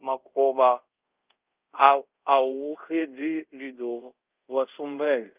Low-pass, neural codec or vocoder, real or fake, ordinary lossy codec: 3.6 kHz; codec, 24 kHz, 0.5 kbps, DualCodec; fake; Opus, 24 kbps